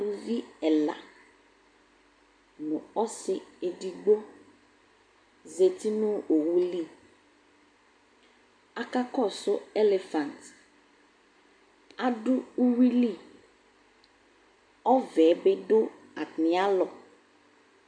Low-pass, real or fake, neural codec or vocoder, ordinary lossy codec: 9.9 kHz; real; none; MP3, 64 kbps